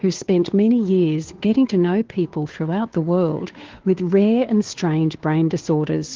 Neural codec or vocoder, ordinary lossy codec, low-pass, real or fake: codec, 16 kHz, 2 kbps, FunCodec, trained on Chinese and English, 25 frames a second; Opus, 16 kbps; 7.2 kHz; fake